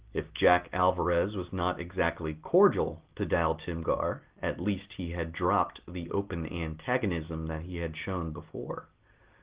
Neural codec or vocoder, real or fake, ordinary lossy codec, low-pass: none; real; Opus, 16 kbps; 3.6 kHz